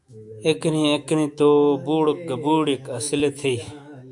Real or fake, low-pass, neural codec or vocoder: fake; 10.8 kHz; autoencoder, 48 kHz, 128 numbers a frame, DAC-VAE, trained on Japanese speech